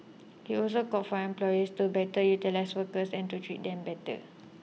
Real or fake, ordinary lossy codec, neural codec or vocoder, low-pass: real; none; none; none